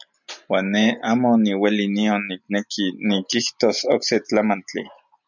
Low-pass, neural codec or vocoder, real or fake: 7.2 kHz; none; real